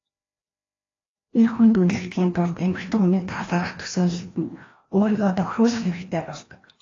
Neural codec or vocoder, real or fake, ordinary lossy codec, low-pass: codec, 16 kHz, 1 kbps, FreqCodec, larger model; fake; AAC, 32 kbps; 7.2 kHz